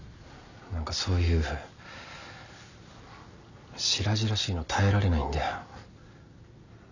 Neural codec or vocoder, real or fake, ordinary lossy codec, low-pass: none; real; none; 7.2 kHz